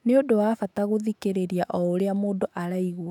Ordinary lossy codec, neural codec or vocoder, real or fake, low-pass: none; autoencoder, 48 kHz, 128 numbers a frame, DAC-VAE, trained on Japanese speech; fake; 19.8 kHz